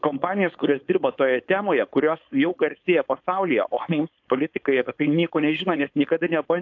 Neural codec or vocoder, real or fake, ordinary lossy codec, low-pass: codec, 16 kHz, 4.8 kbps, FACodec; fake; AAC, 48 kbps; 7.2 kHz